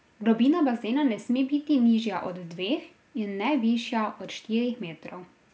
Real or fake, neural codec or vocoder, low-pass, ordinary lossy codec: real; none; none; none